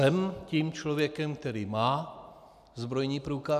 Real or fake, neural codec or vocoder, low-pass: real; none; 14.4 kHz